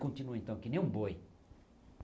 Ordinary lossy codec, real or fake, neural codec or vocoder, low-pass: none; real; none; none